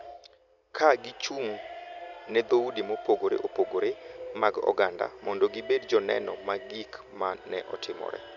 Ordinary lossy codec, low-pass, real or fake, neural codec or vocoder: none; 7.2 kHz; real; none